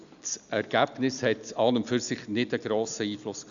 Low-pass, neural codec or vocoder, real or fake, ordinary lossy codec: 7.2 kHz; none; real; none